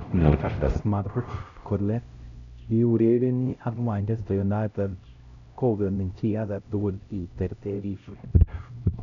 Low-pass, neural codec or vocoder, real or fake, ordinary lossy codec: 7.2 kHz; codec, 16 kHz, 0.5 kbps, X-Codec, HuBERT features, trained on LibriSpeech; fake; none